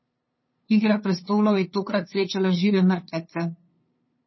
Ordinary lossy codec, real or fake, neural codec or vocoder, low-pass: MP3, 24 kbps; fake; codec, 16 kHz, 2 kbps, FunCodec, trained on LibriTTS, 25 frames a second; 7.2 kHz